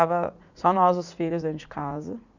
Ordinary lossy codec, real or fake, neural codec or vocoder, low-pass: none; fake; vocoder, 44.1 kHz, 80 mel bands, Vocos; 7.2 kHz